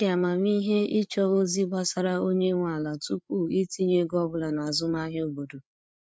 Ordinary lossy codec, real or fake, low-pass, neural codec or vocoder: none; fake; none; codec, 16 kHz, 8 kbps, FreqCodec, larger model